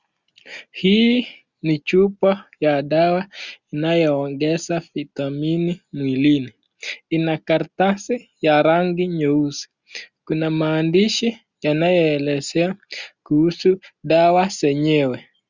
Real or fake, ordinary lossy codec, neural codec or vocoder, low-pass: real; Opus, 64 kbps; none; 7.2 kHz